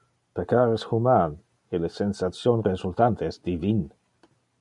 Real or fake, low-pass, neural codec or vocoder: real; 10.8 kHz; none